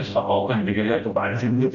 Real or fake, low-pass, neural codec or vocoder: fake; 7.2 kHz; codec, 16 kHz, 0.5 kbps, FreqCodec, smaller model